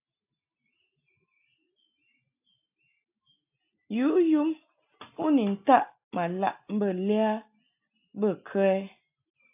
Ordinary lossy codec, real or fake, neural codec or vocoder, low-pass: AAC, 32 kbps; real; none; 3.6 kHz